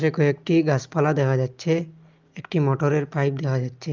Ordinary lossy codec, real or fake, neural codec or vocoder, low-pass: Opus, 24 kbps; real; none; 7.2 kHz